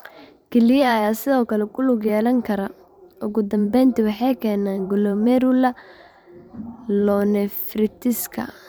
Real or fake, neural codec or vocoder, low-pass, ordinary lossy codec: fake; vocoder, 44.1 kHz, 128 mel bands every 512 samples, BigVGAN v2; none; none